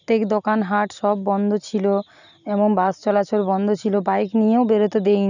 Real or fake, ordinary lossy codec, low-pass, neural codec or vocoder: real; none; 7.2 kHz; none